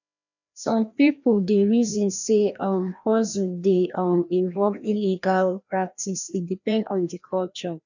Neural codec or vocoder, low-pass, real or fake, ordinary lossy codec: codec, 16 kHz, 1 kbps, FreqCodec, larger model; 7.2 kHz; fake; none